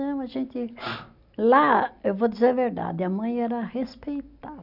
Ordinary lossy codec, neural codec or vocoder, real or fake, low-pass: none; none; real; 5.4 kHz